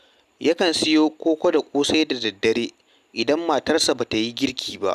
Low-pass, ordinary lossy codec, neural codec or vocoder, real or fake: 14.4 kHz; none; none; real